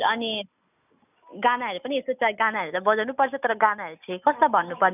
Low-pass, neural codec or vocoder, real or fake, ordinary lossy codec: 3.6 kHz; none; real; none